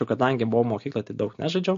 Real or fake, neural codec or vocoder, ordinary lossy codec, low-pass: real; none; MP3, 48 kbps; 7.2 kHz